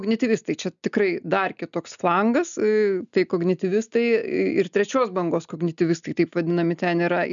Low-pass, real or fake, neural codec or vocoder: 7.2 kHz; real; none